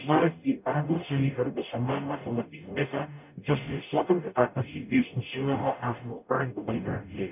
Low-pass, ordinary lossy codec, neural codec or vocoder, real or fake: 3.6 kHz; none; codec, 44.1 kHz, 0.9 kbps, DAC; fake